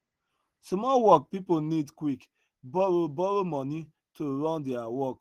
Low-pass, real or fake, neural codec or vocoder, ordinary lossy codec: 14.4 kHz; real; none; Opus, 16 kbps